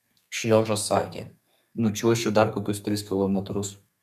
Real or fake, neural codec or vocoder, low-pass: fake; codec, 32 kHz, 1.9 kbps, SNAC; 14.4 kHz